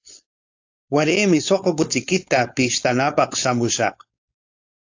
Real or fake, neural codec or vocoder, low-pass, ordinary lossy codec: fake; codec, 16 kHz, 4.8 kbps, FACodec; 7.2 kHz; AAC, 48 kbps